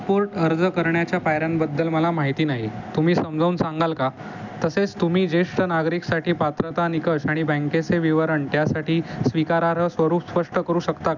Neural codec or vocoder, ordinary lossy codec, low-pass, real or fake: none; none; 7.2 kHz; real